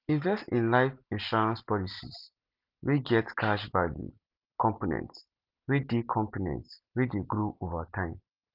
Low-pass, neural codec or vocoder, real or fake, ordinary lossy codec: 5.4 kHz; none; real; Opus, 24 kbps